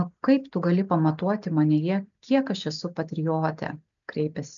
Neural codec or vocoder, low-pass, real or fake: none; 7.2 kHz; real